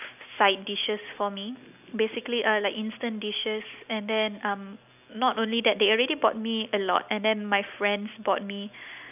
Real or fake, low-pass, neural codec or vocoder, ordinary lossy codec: real; 3.6 kHz; none; none